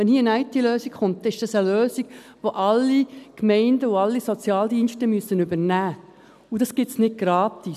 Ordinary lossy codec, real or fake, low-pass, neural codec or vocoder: none; real; 14.4 kHz; none